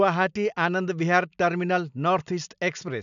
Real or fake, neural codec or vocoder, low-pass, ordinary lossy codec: real; none; 7.2 kHz; none